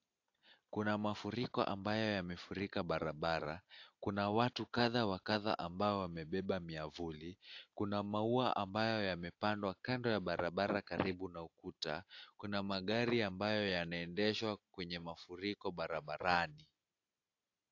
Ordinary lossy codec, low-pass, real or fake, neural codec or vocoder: AAC, 48 kbps; 7.2 kHz; real; none